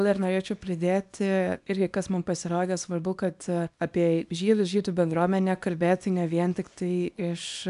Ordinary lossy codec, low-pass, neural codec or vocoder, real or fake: AAC, 96 kbps; 10.8 kHz; codec, 24 kHz, 0.9 kbps, WavTokenizer, small release; fake